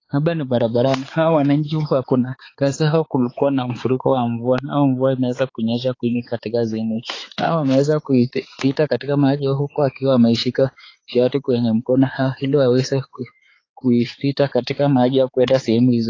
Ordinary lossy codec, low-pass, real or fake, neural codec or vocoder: AAC, 32 kbps; 7.2 kHz; fake; codec, 16 kHz, 4 kbps, X-Codec, HuBERT features, trained on balanced general audio